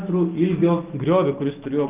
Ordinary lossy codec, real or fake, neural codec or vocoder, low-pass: Opus, 24 kbps; real; none; 3.6 kHz